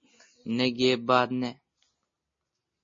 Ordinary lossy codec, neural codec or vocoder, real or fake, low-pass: MP3, 32 kbps; none; real; 7.2 kHz